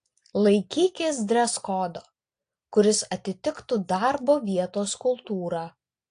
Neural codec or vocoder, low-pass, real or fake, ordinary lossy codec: none; 9.9 kHz; real; AAC, 48 kbps